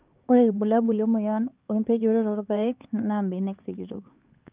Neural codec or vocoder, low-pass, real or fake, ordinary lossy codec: codec, 16 kHz, 4 kbps, X-Codec, HuBERT features, trained on LibriSpeech; 3.6 kHz; fake; Opus, 32 kbps